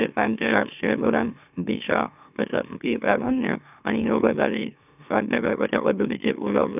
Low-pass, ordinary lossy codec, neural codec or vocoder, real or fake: 3.6 kHz; none; autoencoder, 44.1 kHz, a latent of 192 numbers a frame, MeloTTS; fake